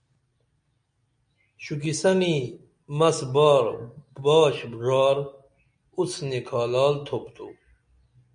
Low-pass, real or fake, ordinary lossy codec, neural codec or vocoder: 9.9 kHz; real; MP3, 96 kbps; none